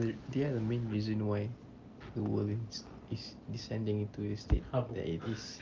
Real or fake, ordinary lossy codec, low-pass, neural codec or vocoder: real; Opus, 24 kbps; 7.2 kHz; none